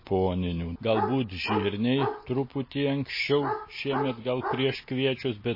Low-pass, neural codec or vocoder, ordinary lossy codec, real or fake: 5.4 kHz; none; MP3, 24 kbps; real